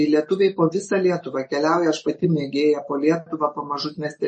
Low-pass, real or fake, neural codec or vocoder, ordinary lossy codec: 10.8 kHz; real; none; MP3, 32 kbps